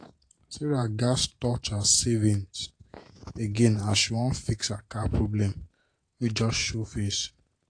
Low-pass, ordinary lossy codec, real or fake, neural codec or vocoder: 9.9 kHz; AAC, 48 kbps; fake; vocoder, 48 kHz, 128 mel bands, Vocos